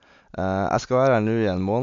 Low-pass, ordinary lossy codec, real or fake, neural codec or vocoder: 7.2 kHz; MP3, 64 kbps; real; none